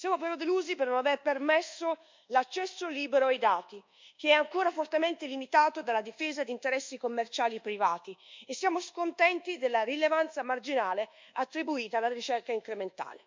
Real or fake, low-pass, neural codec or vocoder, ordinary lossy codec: fake; 7.2 kHz; codec, 24 kHz, 1.2 kbps, DualCodec; MP3, 64 kbps